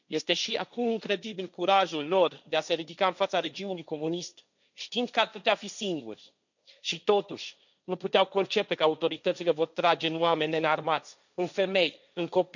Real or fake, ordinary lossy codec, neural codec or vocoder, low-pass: fake; none; codec, 16 kHz, 1.1 kbps, Voila-Tokenizer; 7.2 kHz